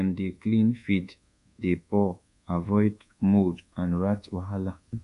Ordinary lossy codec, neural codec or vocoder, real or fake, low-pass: none; codec, 24 kHz, 1.2 kbps, DualCodec; fake; 10.8 kHz